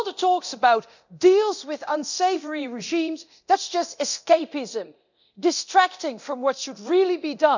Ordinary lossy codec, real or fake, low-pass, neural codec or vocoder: none; fake; 7.2 kHz; codec, 24 kHz, 0.9 kbps, DualCodec